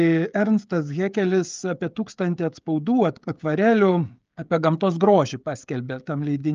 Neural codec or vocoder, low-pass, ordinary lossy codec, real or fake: codec, 16 kHz, 16 kbps, FreqCodec, smaller model; 7.2 kHz; Opus, 24 kbps; fake